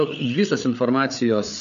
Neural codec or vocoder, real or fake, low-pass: codec, 16 kHz, 8 kbps, FreqCodec, larger model; fake; 7.2 kHz